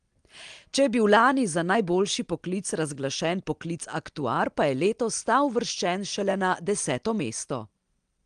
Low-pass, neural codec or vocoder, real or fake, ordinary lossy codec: 9.9 kHz; none; real; Opus, 24 kbps